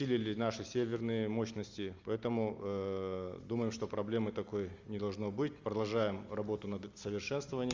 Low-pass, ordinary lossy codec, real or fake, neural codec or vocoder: 7.2 kHz; Opus, 32 kbps; real; none